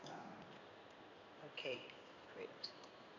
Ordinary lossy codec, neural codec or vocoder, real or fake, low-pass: none; codec, 16 kHz in and 24 kHz out, 1 kbps, XY-Tokenizer; fake; 7.2 kHz